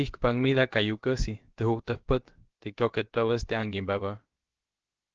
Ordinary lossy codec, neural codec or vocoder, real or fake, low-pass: Opus, 16 kbps; codec, 16 kHz, about 1 kbps, DyCAST, with the encoder's durations; fake; 7.2 kHz